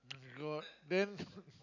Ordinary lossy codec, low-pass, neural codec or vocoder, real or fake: none; 7.2 kHz; none; real